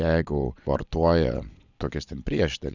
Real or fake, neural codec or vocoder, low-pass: fake; vocoder, 22.05 kHz, 80 mel bands, Vocos; 7.2 kHz